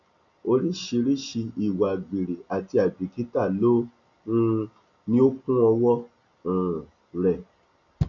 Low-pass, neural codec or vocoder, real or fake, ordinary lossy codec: 7.2 kHz; none; real; none